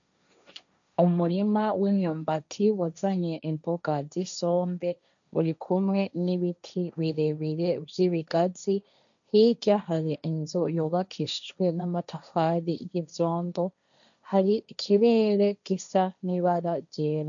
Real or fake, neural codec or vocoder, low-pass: fake; codec, 16 kHz, 1.1 kbps, Voila-Tokenizer; 7.2 kHz